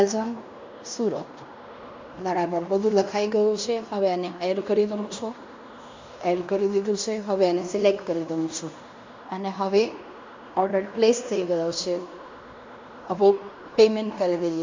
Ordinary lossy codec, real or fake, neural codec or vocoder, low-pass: MP3, 64 kbps; fake; codec, 16 kHz in and 24 kHz out, 0.9 kbps, LongCat-Audio-Codec, fine tuned four codebook decoder; 7.2 kHz